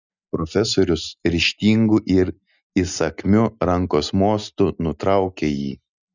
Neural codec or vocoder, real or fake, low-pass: none; real; 7.2 kHz